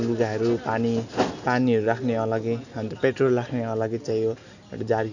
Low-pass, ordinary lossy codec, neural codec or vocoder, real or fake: 7.2 kHz; none; none; real